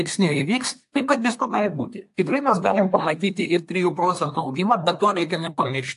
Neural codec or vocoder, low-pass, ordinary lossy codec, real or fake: codec, 24 kHz, 1 kbps, SNAC; 10.8 kHz; AAC, 64 kbps; fake